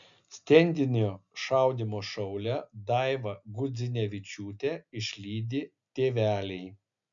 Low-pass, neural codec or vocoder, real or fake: 7.2 kHz; none; real